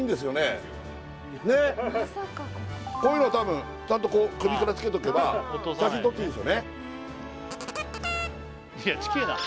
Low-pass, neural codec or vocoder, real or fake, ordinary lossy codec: none; none; real; none